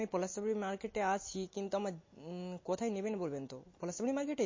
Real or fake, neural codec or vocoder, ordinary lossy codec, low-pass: real; none; MP3, 32 kbps; 7.2 kHz